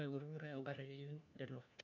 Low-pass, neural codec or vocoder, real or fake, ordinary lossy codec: 7.2 kHz; codec, 16 kHz, 1 kbps, FunCodec, trained on LibriTTS, 50 frames a second; fake; none